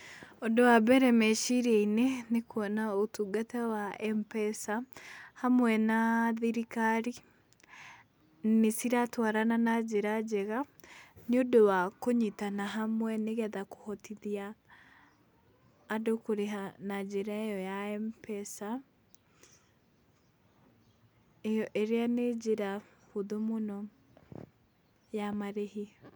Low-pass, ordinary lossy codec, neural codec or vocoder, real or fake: none; none; none; real